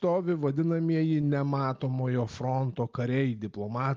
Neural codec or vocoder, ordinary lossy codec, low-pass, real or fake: none; Opus, 32 kbps; 7.2 kHz; real